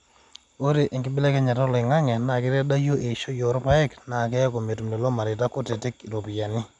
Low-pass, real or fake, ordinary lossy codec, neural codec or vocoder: 10.8 kHz; real; none; none